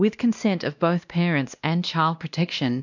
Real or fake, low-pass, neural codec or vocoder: fake; 7.2 kHz; codec, 16 kHz, 1 kbps, X-Codec, WavLM features, trained on Multilingual LibriSpeech